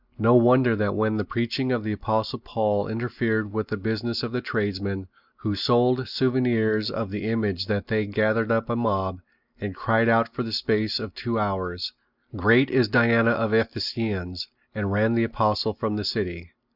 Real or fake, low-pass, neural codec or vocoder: real; 5.4 kHz; none